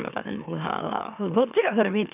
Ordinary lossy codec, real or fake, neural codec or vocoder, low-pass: none; fake; autoencoder, 44.1 kHz, a latent of 192 numbers a frame, MeloTTS; 3.6 kHz